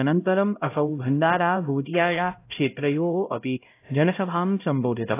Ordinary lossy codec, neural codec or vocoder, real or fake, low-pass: AAC, 24 kbps; codec, 16 kHz, 0.5 kbps, X-Codec, HuBERT features, trained on LibriSpeech; fake; 3.6 kHz